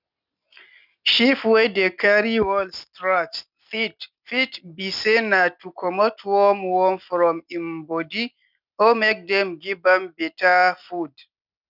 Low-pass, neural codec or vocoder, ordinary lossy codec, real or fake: 5.4 kHz; none; none; real